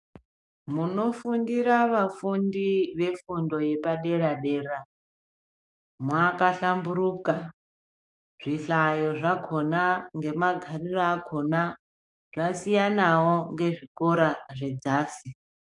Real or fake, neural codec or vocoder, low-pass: fake; codec, 44.1 kHz, 7.8 kbps, DAC; 10.8 kHz